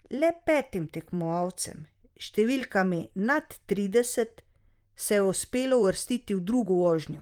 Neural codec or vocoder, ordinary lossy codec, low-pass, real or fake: vocoder, 44.1 kHz, 128 mel bands, Pupu-Vocoder; Opus, 32 kbps; 19.8 kHz; fake